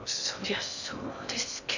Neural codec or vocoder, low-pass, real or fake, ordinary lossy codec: codec, 16 kHz in and 24 kHz out, 0.6 kbps, FocalCodec, streaming, 2048 codes; 7.2 kHz; fake; none